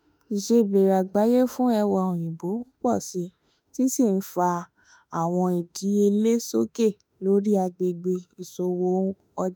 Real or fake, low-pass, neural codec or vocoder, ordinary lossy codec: fake; none; autoencoder, 48 kHz, 32 numbers a frame, DAC-VAE, trained on Japanese speech; none